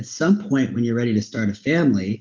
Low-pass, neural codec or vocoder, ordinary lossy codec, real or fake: 7.2 kHz; none; Opus, 32 kbps; real